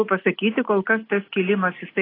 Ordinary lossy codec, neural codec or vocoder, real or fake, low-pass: AAC, 24 kbps; none; real; 5.4 kHz